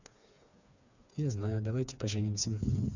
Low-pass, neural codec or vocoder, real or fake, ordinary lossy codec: 7.2 kHz; codec, 16 kHz, 4 kbps, FreqCodec, smaller model; fake; none